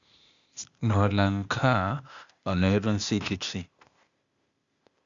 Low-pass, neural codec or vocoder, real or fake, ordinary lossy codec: 7.2 kHz; codec, 16 kHz, 0.8 kbps, ZipCodec; fake; Opus, 64 kbps